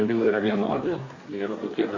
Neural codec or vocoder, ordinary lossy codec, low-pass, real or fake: codec, 24 kHz, 1 kbps, SNAC; AAC, 32 kbps; 7.2 kHz; fake